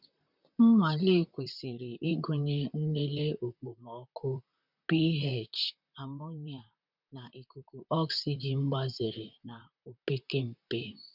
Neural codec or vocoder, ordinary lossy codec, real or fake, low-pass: vocoder, 44.1 kHz, 128 mel bands, Pupu-Vocoder; none; fake; 5.4 kHz